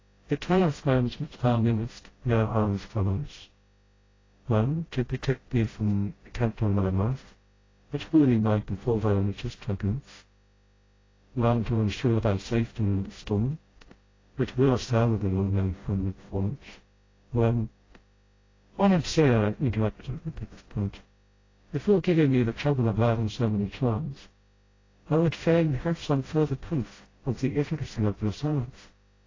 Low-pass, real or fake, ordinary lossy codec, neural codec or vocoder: 7.2 kHz; fake; AAC, 32 kbps; codec, 16 kHz, 0.5 kbps, FreqCodec, smaller model